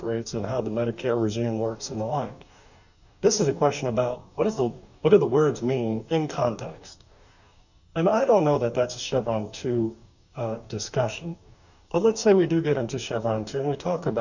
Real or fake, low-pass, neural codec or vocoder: fake; 7.2 kHz; codec, 44.1 kHz, 2.6 kbps, DAC